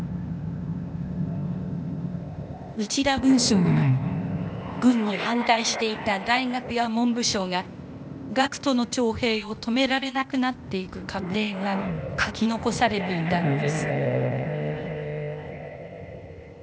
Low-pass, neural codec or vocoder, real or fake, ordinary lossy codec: none; codec, 16 kHz, 0.8 kbps, ZipCodec; fake; none